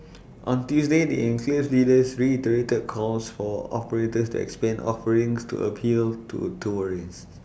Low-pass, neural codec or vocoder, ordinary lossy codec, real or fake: none; none; none; real